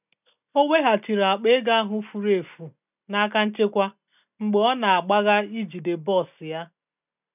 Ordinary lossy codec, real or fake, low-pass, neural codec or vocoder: none; real; 3.6 kHz; none